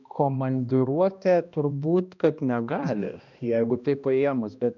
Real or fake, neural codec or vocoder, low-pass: fake; codec, 16 kHz, 1 kbps, X-Codec, HuBERT features, trained on balanced general audio; 7.2 kHz